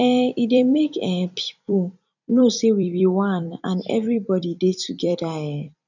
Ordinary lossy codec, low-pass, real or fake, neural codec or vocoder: none; 7.2 kHz; fake; vocoder, 44.1 kHz, 128 mel bands every 256 samples, BigVGAN v2